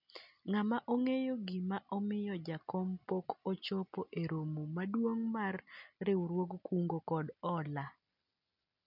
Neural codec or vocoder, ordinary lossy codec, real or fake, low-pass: none; none; real; 5.4 kHz